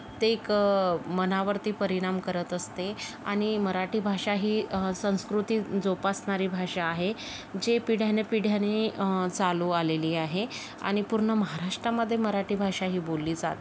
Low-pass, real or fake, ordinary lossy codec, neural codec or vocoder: none; real; none; none